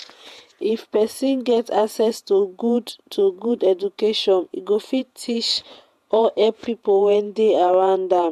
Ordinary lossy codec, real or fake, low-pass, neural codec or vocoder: AAC, 96 kbps; fake; 14.4 kHz; vocoder, 48 kHz, 128 mel bands, Vocos